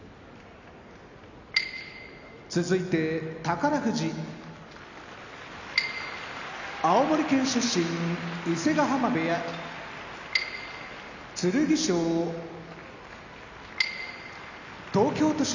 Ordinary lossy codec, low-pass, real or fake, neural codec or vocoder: none; 7.2 kHz; real; none